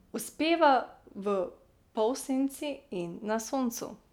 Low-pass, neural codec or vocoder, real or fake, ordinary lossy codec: 19.8 kHz; none; real; none